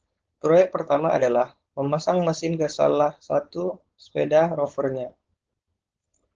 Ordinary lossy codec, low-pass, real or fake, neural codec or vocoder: Opus, 16 kbps; 7.2 kHz; fake; codec, 16 kHz, 4.8 kbps, FACodec